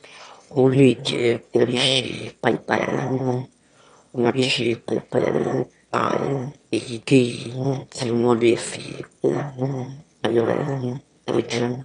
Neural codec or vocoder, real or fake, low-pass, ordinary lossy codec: autoencoder, 22.05 kHz, a latent of 192 numbers a frame, VITS, trained on one speaker; fake; 9.9 kHz; MP3, 64 kbps